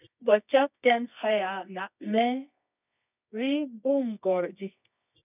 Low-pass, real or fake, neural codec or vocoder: 3.6 kHz; fake; codec, 24 kHz, 0.9 kbps, WavTokenizer, medium music audio release